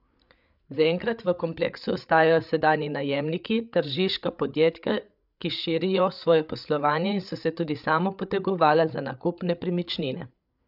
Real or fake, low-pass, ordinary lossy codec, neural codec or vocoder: fake; 5.4 kHz; none; codec, 16 kHz, 8 kbps, FreqCodec, larger model